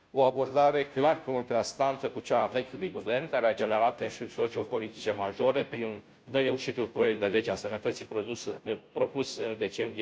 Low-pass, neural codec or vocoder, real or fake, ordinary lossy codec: none; codec, 16 kHz, 0.5 kbps, FunCodec, trained on Chinese and English, 25 frames a second; fake; none